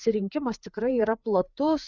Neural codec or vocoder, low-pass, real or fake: codec, 16 kHz, 4 kbps, FreqCodec, larger model; 7.2 kHz; fake